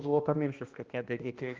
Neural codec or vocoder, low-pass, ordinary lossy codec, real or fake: codec, 16 kHz, 1 kbps, X-Codec, HuBERT features, trained on balanced general audio; 7.2 kHz; Opus, 32 kbps; fake